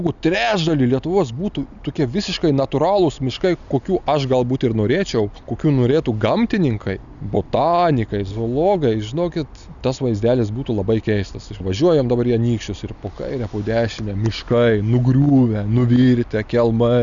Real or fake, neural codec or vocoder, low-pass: real; none; 7.2 kHz